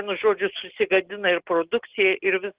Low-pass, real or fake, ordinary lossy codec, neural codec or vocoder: 3.6 kHz; real; Opus, 16 kbps; none